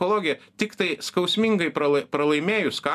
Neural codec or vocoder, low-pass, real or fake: none; 14.4 kHz; real